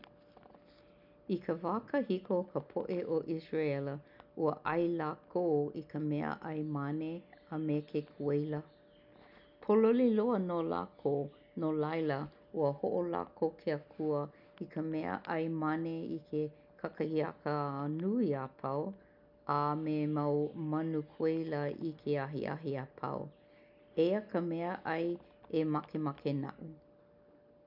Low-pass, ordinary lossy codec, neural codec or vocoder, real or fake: 5.4 kHz; none; none; real